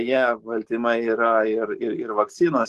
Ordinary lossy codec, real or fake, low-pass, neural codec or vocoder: Opus, 16 kbps; real; 14.4 kHz; none